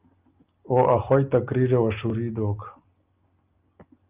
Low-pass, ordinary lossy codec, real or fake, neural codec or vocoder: 3.6 kHz; Opus, 24 kbps; real; none